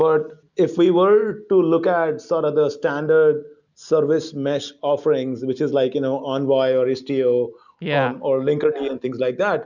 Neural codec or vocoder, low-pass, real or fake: none; 7.2 kHz; real